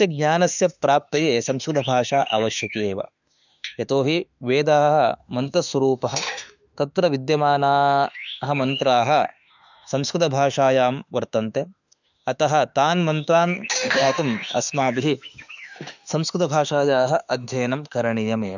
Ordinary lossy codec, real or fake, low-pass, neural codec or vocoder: none; fake; 7.2 kHz; autoencoder, 48 kHz, 32 numbers a frame, DAC-VAE, trained on Japanese speech